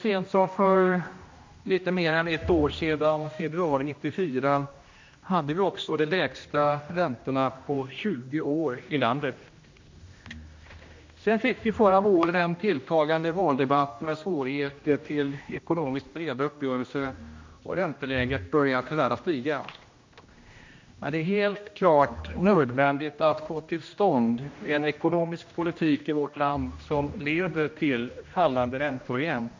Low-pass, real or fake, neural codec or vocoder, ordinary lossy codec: 7.2 kHz; fake; codec, 16 kHz, 1 kbps, X-Codec, HuBERT features, trained on general audio; MP3, 48 kbps